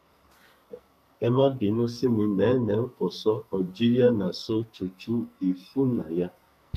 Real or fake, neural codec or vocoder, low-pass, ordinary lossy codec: fake; codec, 44.1 kHz, 2.6 kbps, SNAC; 14.4 kHz; none